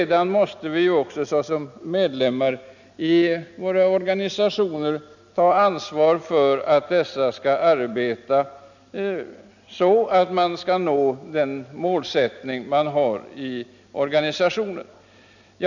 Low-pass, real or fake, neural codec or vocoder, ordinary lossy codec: 7.2 kHz; real; none; none